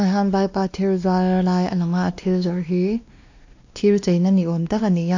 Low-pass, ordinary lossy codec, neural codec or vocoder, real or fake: 7.2 kHz; AAC, 48 kbps; codec, 16 kHz, 2 kbps, X-Codec, WavLM features, trained on Multilingual LibriSpeech; fake